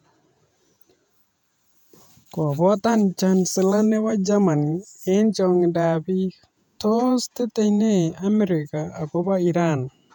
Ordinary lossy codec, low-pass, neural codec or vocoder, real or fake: none; 19.8 kHz; vocoder, 48 kHz, 128 mel bands, Vocos; fake